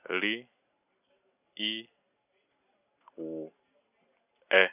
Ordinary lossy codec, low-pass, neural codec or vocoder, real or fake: none; 3.6 kHz; none; real